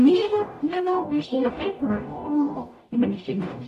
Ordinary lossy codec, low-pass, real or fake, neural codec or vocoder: AAC, 64 kbps; 14.4 kHz; fake; codec, 44.1 kHz, 0.9 kbps, DAC